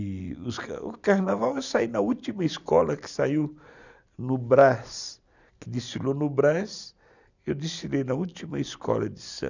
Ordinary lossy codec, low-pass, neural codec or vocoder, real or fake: none; 7.2 kHz; none; real